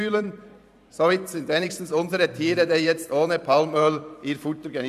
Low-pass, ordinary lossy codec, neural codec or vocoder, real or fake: 14.4 kHz; none; vocoder, 44.1 kHz, 128 mel bands every 512 samples, BigVGAN v2; fake